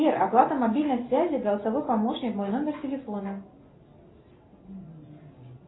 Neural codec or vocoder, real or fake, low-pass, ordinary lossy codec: none; real; 7.2 kHz; AAC, 16 kbps